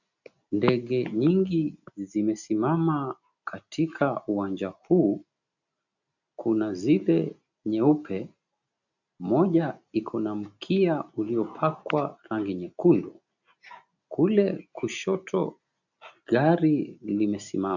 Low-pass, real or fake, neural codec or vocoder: 7.2 kHz; real; none